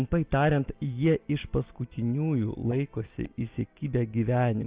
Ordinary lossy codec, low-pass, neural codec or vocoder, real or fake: Opus, 24 kbps; 3.6 kHz; none; real